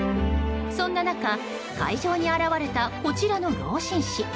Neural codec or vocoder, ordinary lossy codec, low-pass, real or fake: none; none; none; real